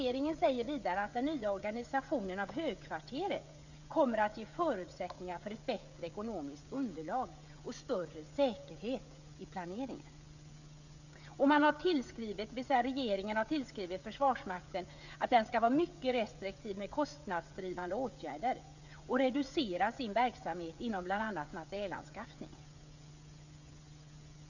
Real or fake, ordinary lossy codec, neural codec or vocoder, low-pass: fake; none; codec, 16 kHz, 16 kbps, FreqCodec, smaller model; 7.2 kHz